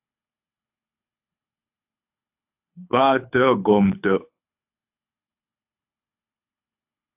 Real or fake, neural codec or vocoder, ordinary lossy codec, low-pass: fake; codec, 24 kHz, 6 kbps, HILCodec; AAC, 32 kbps; 3.6 kHz